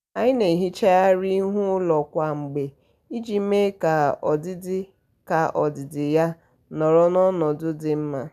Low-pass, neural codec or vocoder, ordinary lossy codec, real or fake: 14.4 kHz; none; none; real